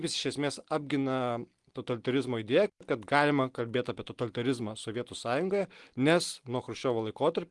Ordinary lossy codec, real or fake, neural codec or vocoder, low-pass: Opus, 24 kbps; real; none; 10.8 kHz